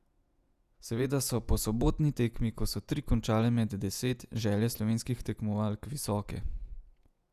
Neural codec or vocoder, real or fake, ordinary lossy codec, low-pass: vocoder, 48 kHz, 128 mel bands, Vocos; fake; none; 14.4 kHz